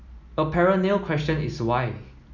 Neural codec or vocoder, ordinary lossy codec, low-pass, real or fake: none; none; 7.2 kHz; real